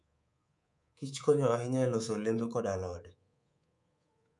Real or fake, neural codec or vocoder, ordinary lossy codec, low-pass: fake; codec, 24 kHz, 3.1 kbps, DualCodec; none; 10.8 kHz